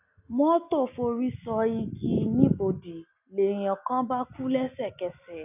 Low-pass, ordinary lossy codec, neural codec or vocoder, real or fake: 3.6 kHz; none; none; real